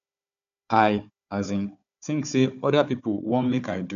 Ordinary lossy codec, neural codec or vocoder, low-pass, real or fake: none; codec, 16 kHz, 4 kbps, FunCodec, trained on Chinese and English, 50 frames a second; 7.2 kHz; fake